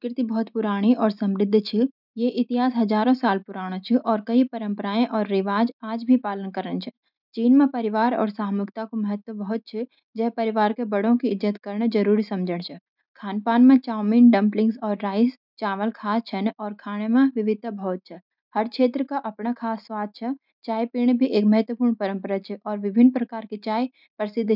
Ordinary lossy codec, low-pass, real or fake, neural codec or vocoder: none; 5.4 kHz; real; none